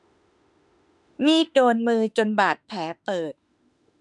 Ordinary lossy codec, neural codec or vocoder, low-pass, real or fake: none; autoencoder, 48 kHz, 32 numbers a frame, DAC-VAE, trained on Japanese speech; 10.8 kHz; fake